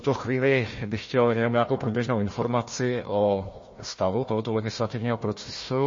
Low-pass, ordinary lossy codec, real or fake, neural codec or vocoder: 7.2 kHz; MP3, 32 kbps; fake; codec, 16 kHz, 1 kbps, FunCodec, trained on Chinese and English, 50 frames a second